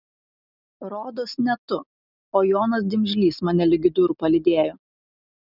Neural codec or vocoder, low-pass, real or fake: none; 5.4 kHz; real